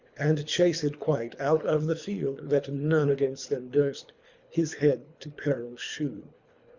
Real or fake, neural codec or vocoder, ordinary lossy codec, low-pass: fake; codec, 24 kHz, 3 kbps, HILCodec; Opus, 64 kbps; 7.2 kHz